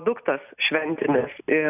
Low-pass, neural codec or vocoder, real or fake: 3.6 kHz; none; real